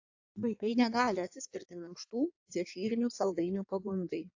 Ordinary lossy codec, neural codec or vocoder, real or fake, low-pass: AAC, 48 kbps; codec, 16 kHz in and 24 kHz out, 1.1 kbps, FireRedTTS-2 codec; fake; 7.2 kHz